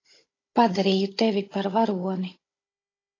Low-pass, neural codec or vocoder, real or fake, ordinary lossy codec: 7.2 kHz; codec, 16 kHz, 16 kbps, FunCodec, trained on Chinese and English, 50 frames a second; fake; AAC, 32 kbps